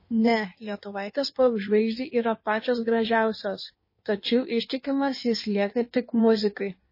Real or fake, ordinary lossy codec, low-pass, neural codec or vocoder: fake; MP3, 24 kbps; 5.4 kHz; codec, 16 kHz in and 24 kHz out, 1.1 kbps, FireRedTTS-2 codec